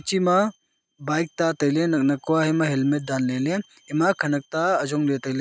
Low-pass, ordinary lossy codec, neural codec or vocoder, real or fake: none; none; none; real